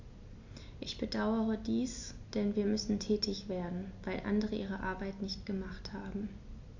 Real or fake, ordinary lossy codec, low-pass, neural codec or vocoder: real; AAC, 48 kbps; 7.2 kHz; none